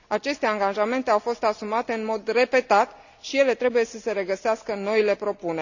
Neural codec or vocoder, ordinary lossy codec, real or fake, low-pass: none; none; real; 7.2 kHz